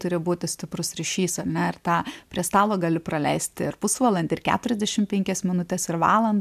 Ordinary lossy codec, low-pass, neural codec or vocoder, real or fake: MP3, 96 kbps; 14.4 kHz; none; real